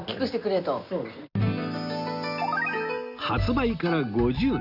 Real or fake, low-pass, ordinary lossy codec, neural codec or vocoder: real; 5.4 kHz; Opus, 64 kbps; none